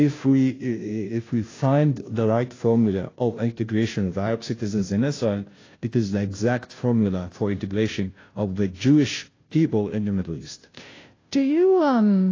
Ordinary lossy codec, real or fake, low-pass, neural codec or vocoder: AAC, 32 kbps; fake; 7.2 kHz; codec, 16 kHz, 0.5 kbps, FunCodec, trained on Chinese and English, 25 frames a second